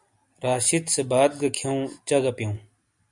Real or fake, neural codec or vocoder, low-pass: real; none; 10.8 kHz